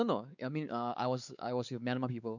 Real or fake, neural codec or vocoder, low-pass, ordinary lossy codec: fake; codec, 16 kHz, 4 kbps, X-Codec, WavLM features, trained on Multilingual LibriSpeech; 7.2 kHz; none